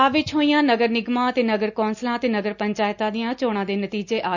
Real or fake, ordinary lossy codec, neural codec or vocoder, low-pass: real; none; none; 7.2 kHz